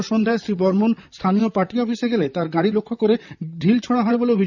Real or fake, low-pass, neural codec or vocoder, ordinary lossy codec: fake; 7.2 kHz; vocoder, 44.1 kHz, 128 mel bands, Pupu-Vocoder; none